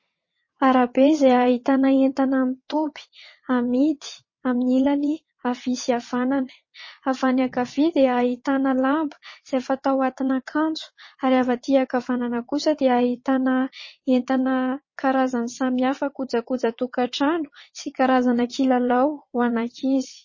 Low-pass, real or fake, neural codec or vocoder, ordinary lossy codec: 7.2 kHz; fake; vocoder, 22.05 kHz, 80 mel bands, WaveNeXt; MP3, 32 kbps